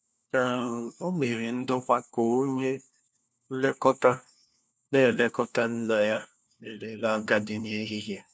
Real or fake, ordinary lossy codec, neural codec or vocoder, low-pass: fake; none; codec, 16 kHz, 1 kbps, FunCodec, trained on LibriTTS, 50 frames a second; none